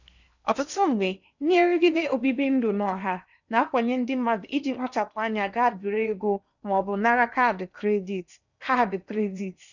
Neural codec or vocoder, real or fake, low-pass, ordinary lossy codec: codec, 16 kHz in and 24 kHz out, 0.8 kbps, FocalCodec, streaming, 65536 codes; fake; 7.2 kHz; none